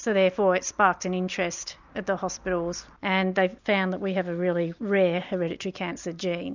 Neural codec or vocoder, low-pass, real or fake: none; 7.2 kHz; real